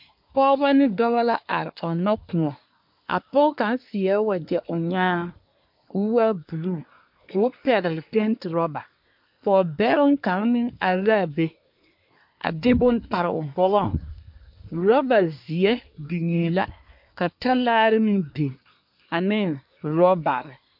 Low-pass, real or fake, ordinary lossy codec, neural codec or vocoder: 5.4 kHz; fake; MP3, 48 kbps; codec, 24 kHz, 1 kbps, SNAC